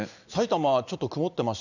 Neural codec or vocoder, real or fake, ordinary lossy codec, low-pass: none; real; none; 7.2 kHz